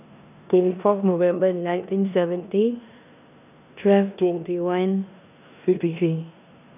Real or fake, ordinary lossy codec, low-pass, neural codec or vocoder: fake; none; 3.6 kHz; codec, 16 kHz in and 24 kHz out, 0.9 kbps, LongCat-Audio-Codec, four codebook decoder